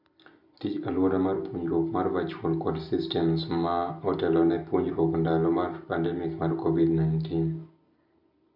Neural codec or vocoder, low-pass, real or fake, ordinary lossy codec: none; 5.4 kHz; real; none